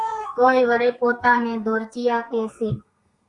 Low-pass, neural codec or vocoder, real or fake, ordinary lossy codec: 10.8 kHz; codec, 32 kHz, 1.9 kbps, SNAC; fake; Opus, 64 kbps